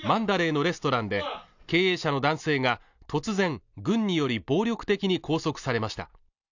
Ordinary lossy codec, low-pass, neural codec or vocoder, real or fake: none; 7.2 kHz; none; real